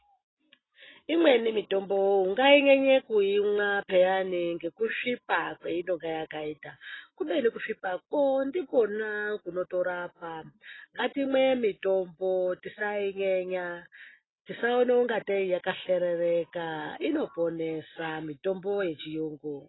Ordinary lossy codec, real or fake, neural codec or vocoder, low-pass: AAC, 16 kbps; real; none; 7.2 kHz